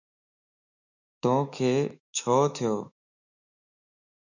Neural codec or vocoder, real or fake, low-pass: autoencoder, 48 kHz, 128 numbers a frame, DAC-VAE, trained on Japanese speech; fake; 7.2 kHz